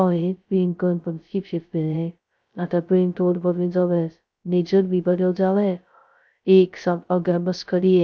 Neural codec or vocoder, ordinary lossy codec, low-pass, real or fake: codec, 16 kHz, 0.2 kbps, FocalCodec; none; none; fake